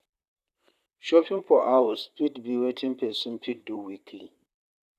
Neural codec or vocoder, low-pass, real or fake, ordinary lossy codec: vocoder, 44.1 kHz, 128 mel bands, Pupu-Vocoder; 14.4 kHz; fake; none